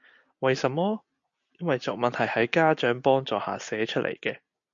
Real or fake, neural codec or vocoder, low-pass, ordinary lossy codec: real; none; 7.2 kHz; MP3, 48 kbps